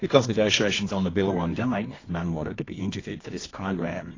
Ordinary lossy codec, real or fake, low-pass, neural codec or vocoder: AAC, 32 kbps; fake; 7.2 kHz; codec, 24 kHz, 0.9 kbps, WavTokenizer, medium music audio release